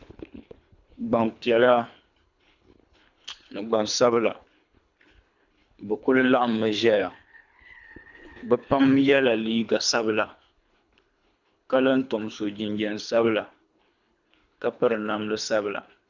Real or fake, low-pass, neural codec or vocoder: fake; 7.2 kHz; codec, 24 kHz, 3 kbps, HILCodec